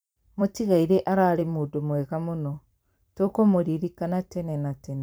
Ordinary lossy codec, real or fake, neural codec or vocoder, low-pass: none; fake; vocoder, 44.1 kHz, 128 mel bands every 256 samples, BigVGAN v2; none